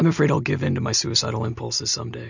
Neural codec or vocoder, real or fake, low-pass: none; real; 7.2 kHz